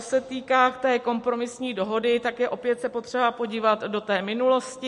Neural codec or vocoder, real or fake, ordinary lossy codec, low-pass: none; real; MP3, 48 kbps; 14.4 kHz